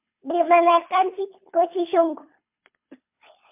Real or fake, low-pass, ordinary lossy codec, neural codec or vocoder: fake; 3.6 kHz; MP3, 32 kbps; codec, 24 kHz, 3 kbps, HILCodec